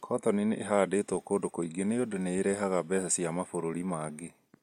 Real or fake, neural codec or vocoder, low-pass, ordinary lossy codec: real; none; 19.8 kHz; MP3, 64 kbps